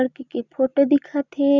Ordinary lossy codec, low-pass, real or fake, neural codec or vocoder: none; 7.2 kHz; real; none